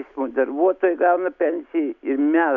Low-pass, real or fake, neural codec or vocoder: 7.2 kHz; real; none